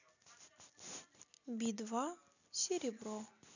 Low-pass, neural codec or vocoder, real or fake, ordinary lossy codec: 7.2 kHz; none; real; none